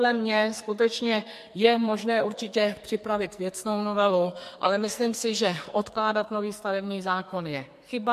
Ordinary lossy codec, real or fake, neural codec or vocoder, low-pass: MP3, 64 kbps; fake; codec, 44.1 kHz, 2.6 kbps, SNAC; 14.4 kHz